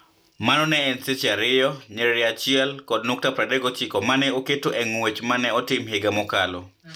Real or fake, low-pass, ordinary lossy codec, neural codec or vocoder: real; none; none; none